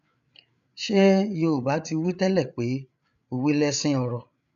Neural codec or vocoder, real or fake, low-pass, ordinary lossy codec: codec, 16 kHz, 8 kbps, FreqCodec, larger model; fake; 7.2 kHz; none